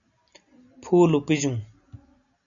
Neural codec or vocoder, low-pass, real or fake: none; 7.2 kHz; real